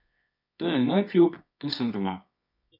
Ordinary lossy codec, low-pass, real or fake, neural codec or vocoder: MP3, 48 kbps; 5.4 kHz; fake; codec, 24 kHz, 0.9 kbps, WavTokenizer, medium music audio release